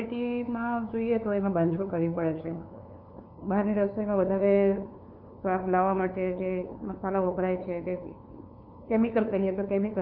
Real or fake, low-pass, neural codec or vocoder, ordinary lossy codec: fake; 5.4 kHz; codec, 16 kHz, 2 kbps, FunCodec, trained on LibriTTS, 25 frames a second; none